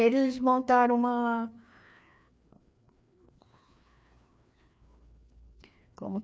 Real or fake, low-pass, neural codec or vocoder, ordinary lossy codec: fake; none; codec, 16 kHz, 2 kbps, FreqCodec, larger model; none